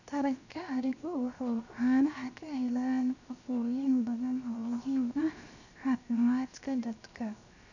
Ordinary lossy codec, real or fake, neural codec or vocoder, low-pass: none; fake; codec, 16 kHz, 0.7 kbps, FocalCodec; 7.2 kHz